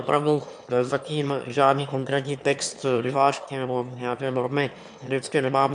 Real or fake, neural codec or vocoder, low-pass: fake; autoencoder, 22.05 kHz, a latent of 192 numbers a frame, VITS, trained on one speaker; 9.9 kHz